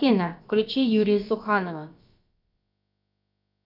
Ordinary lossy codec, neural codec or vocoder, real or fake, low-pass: AAC, 48 kbps; codec, 16 kHz, about 1 kbps, DyCAST, with the encoder's durations; fake; 5.4 kHz